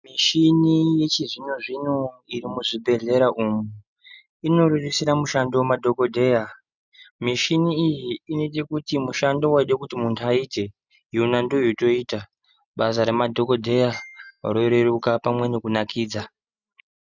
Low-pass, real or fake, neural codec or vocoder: 7.2 kHz; real; none